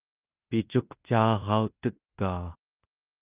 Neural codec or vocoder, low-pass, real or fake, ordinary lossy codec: codec, 16 kHz in and 24 kHz out, 0.9 kbps, LongCat-Audio-Codec, fine tuned four codebook decoder; 3.6 kHz; fake; Opus, 24 kbps